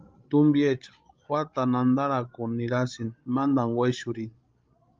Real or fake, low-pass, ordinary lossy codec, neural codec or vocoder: fake; 7.2 kHz; Opus, 24 kbps; codec, 16 kHz, 16 kbps, FreqCodec, larger model